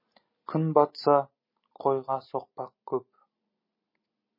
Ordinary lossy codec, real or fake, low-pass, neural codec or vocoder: MP3, 24 kbps; real; 5.4 kHz; none